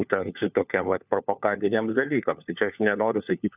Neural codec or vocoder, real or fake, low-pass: codec, 16 kHz, 4 kbps, FunCodec, trained on LibriTTS, 50 frames a second; fake; 3.6 kHz